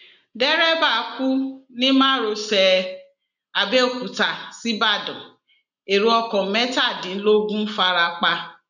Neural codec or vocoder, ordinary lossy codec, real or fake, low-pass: none; none; real; 7.2 kHz